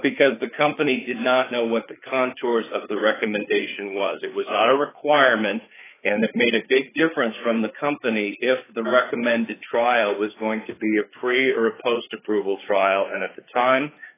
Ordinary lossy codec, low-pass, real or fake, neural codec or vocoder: AAC, 16 kbps; 3.6 kHz; fake; codec, 16 kHz, 4 kbps, FreqCodec, larger model